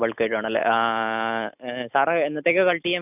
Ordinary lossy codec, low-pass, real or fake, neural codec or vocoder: none; 3.6 kHz; real; none